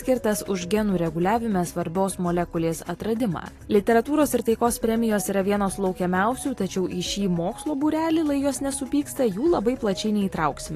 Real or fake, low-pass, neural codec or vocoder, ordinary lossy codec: real; 14.4 kHz; none; AAC, 48 kbps